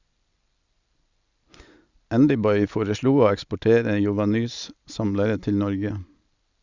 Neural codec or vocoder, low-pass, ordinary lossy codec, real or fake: none; 7.2 kHz; Opus, 64 kbps; real